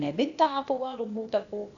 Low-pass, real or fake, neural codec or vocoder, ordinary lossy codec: 7.2 kHz; fake; codec, 16 kHz, 0.8 kbps, ZipCodec; none